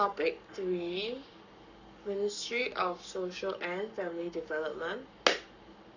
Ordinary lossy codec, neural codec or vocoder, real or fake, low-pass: none; codec, 44.1 kHz, 7.8 kbps, Pupu-Codec; fake; 7.2 kHz